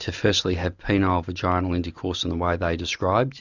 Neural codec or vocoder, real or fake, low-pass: none; real; 7.2 kHz